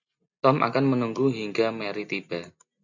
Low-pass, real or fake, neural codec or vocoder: 7.2 kHz; real; none